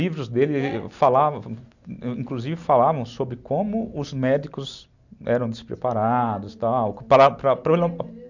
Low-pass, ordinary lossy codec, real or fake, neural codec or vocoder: 7.2 kHz; none; real; none